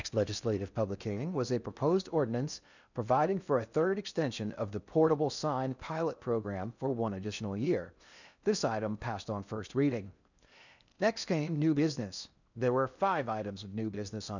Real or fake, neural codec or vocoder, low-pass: fake; codec, 16 kHz in and 24 kHz out, 0.6 kbps, FocalCodec, streaming, 4096 codes; 7.2 kHz